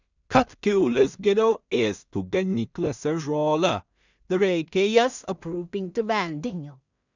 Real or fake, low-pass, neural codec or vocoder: fake; 7.2 kHz; codec, 16 kHz in and 24 kHz out, 0.4 kbps, LongCat-Audio-Codec, two codebook decoder